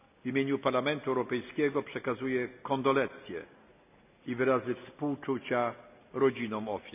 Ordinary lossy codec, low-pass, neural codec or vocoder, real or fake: none; 3.6 kHz; none; real